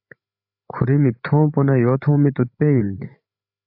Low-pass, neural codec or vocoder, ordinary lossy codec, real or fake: 5.4 kHz; codec, 16 kHz, 8 kbps, FreqCodec, larger model; AAC, 48 kbps; fake